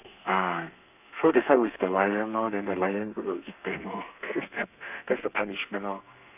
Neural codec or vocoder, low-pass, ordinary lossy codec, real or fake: codec, 32 kHz, 1.9 kbps, SNAC; 3.6 kHz; none; fake